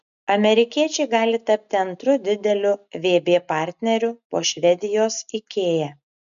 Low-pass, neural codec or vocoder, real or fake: 7.2 kHz; none; real